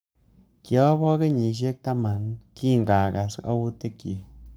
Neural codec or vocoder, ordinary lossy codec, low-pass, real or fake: codec, 44.1 kHz, 7.8 kbps, Pupu-Codec; none; none; fake